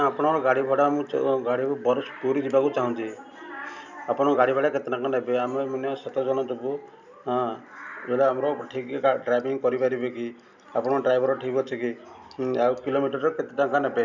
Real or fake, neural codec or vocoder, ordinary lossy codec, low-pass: real; none; none; 7.2 kHz